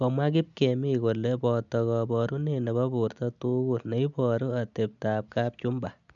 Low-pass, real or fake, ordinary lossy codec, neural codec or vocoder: 7.2 kHz; real; none; none